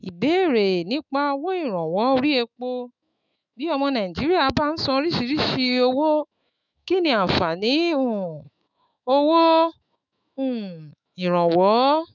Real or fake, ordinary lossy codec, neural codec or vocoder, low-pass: fake; none; autoencoder, 48 kHz, 128 numbers a frame, DAC-VAE, trained on Japanese speech; 7.2 kHz